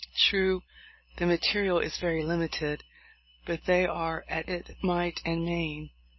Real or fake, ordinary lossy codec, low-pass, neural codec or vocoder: real; MP3, 24 kbps; 7.2 kHz; none